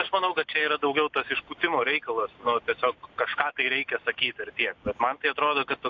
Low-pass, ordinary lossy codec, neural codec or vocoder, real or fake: 7.2 kHz; AAC, 48 kbps; none; real